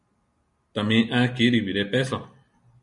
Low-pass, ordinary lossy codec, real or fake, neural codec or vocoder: 10.8 kHz; MP3, 96 kbps; real; none